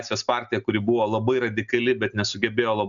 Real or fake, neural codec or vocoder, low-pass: real; none; 7.2 kHz